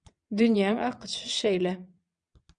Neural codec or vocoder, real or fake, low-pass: vocoder, 22.05 kHz, 80 mel bands, WaveNeXt; fake; 9.9 kHz